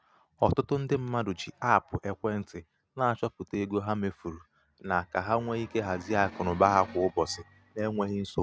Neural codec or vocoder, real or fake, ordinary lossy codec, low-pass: none; real; none; none